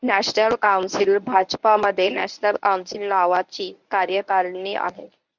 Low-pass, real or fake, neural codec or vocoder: 7.2 kHz; fake; codec, 24 kHz, 0.9 kbps, WavTokenizer, medium speech release version 1